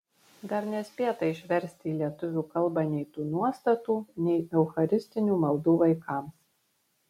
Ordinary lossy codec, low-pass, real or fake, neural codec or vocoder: MP3, 64 kbps; 19.8 kHz; real; none